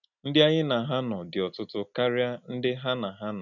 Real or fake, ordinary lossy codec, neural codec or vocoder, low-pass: real; none; none; 7.2 kHz